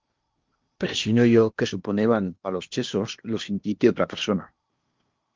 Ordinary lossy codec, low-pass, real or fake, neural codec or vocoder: Opus, 32 kbps; 7.2 kHz; fake; codec, 16 kHz in and 24 kHz out, 0.8 kbps, FocalCodec, streaming, 65536 codes